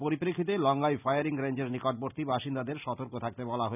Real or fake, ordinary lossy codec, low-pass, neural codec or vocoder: real; none; 3.6 kHz; none